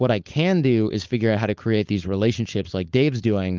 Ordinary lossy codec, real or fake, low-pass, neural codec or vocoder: Opus, 32 kbps; fake; 7.2 kHz; codec, 16 kHz, 4.8 kbps, FACodec